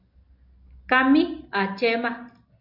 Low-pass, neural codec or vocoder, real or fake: 5.4 kHz; none; real